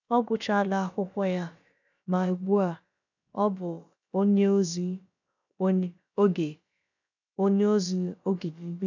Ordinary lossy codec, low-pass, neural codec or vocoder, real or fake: none; 7.2 kHz; codec, 16 kHz, about 1 kbps, DyCAST, with the encoder's durations; fake